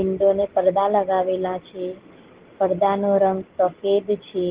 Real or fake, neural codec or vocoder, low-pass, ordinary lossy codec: real; none; 3.6 kHz; Opus, 16 kbps